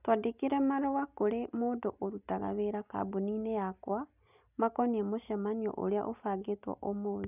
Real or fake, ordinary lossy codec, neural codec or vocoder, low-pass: real; none; none; 3.6 kHz